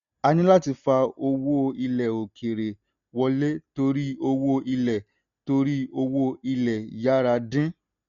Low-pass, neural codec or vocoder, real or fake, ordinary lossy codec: 7.2 kHz; none; real; none